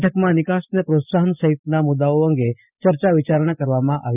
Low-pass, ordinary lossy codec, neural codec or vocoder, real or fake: 3.6 kHz; none; none; real